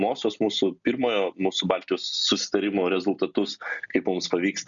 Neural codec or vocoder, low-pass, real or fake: none; 7.2 kHz; real